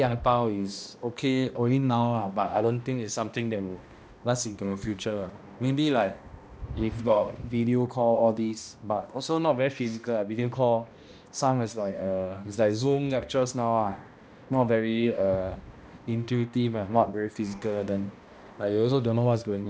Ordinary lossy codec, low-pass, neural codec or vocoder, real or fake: none; none; codec, 16 kHz, 1 kbps, X-Codec, HuBERT features, trained on balanced general audio; fake